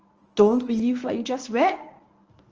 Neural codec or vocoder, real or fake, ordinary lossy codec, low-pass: codec, 24 kHz, 0.9 kbps, WavTokenizer, medium speech release version 1; fake; Opus, 24 kbps; 7.2 kHz